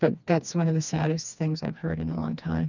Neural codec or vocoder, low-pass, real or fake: codec, 16 kHz, 2 kbps, FreqCodec, smaller model; 7.2 kHz; fake